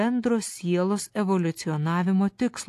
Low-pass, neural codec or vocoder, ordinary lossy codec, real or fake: 14.4 kHz; none; AAC, 64 kbps; real